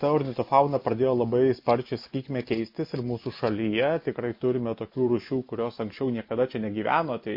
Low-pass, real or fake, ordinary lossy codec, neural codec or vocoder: 5.4 kHz; real; MP3, 32 kbps; none